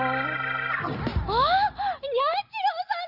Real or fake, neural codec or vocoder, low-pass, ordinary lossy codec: real; none; 5.4 kHz; Opus, 24 kbps